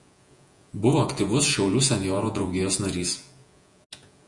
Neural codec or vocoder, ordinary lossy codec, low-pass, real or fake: vocoder, 48 kHz, 128 mel bands, Vocos; Opus, 64 kbps; 10.8 kHz; fake